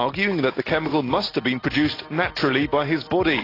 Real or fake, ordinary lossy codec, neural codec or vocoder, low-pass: real; AAC, 24 kbps; none; 5.4 kHz